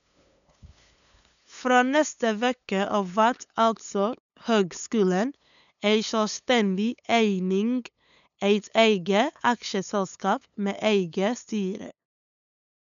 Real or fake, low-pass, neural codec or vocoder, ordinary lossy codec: fake; 7.2 kHz; codec, 16 kHz, 8 kbps, FunCodec, trained on LibriTTS, 25 frames a second; none